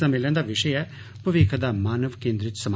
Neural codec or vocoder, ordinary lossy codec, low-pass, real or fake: none; none; none; real